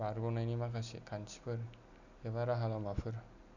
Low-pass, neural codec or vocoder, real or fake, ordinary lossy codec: 7.2 kHz; none; real; none